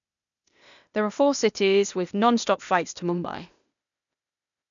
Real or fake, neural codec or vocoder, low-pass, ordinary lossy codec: fake; codec, 16 kHz, 0.8 kbps, ZipCodec; 7.2 kHz; none